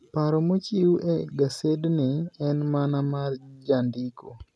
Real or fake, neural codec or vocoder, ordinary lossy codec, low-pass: real; none; none; none